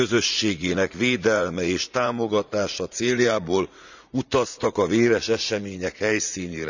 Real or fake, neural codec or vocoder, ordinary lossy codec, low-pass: fake; vocoder, 44.1 kHz, 80 mel bands, Vocos; none; 7.2 kHz